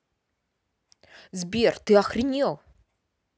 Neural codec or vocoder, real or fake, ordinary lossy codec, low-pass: none; real; none; none